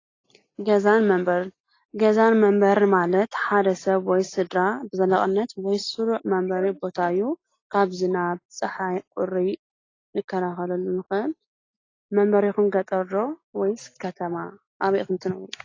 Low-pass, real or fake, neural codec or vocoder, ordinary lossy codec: 7.2 kHz; real; none; AAC, 32 kbps